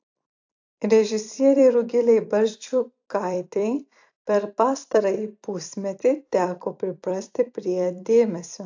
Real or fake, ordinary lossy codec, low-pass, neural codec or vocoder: fake; AAC, 48 kbps; 7.2 kHz; vocoder, 44.1 kHz, 128 mel bands every 256 samples, BigVGAN v2